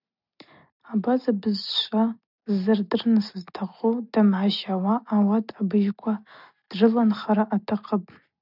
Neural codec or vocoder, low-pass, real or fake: none; 5.4 kHz; real